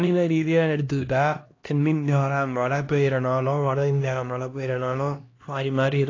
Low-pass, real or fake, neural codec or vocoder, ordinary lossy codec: 7.2 kHz; fake; codec, 16 kHz, 1 kbps, X-Codec, HuBERT features, trained on LibriSpeech; AAC, 32 kbps